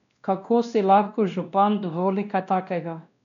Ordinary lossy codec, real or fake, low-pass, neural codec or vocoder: none; fake; 7.2 kHz; codec, 16 kHz, 1 kbps, X-Codec, WavLM features, trained on Multilingual LibriSpeech